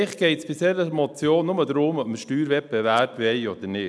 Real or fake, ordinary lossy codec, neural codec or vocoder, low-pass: real; none; none; none